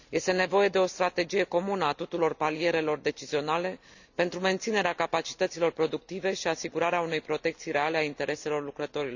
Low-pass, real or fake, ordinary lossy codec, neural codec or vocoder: 7.2 kHz; real; none; none